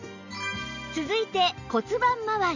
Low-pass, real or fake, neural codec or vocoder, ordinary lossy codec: 7.2 kHz; real; none; none